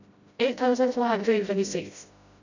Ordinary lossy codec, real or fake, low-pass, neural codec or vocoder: none; fake; 7.2 kHz; codec, 16 kHz, 0.5 kbps, FreqCodec, smaller model